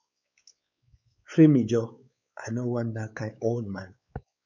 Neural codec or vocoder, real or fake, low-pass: codec, 16 kHz, 4 kbps, X-Codec, WavLM features, trained on Multilingual LibriSpeech; fake; 7.2 kHz